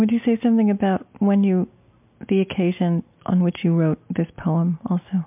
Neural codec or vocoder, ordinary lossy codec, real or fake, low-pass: none; MP3, 32 kbps; real; 3.6 kHz